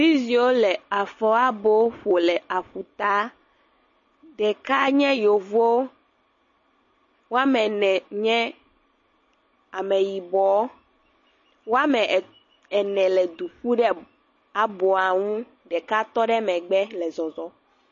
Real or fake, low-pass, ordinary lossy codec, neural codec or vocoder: real; 7.2 kHz; MP3, 32 kbps; none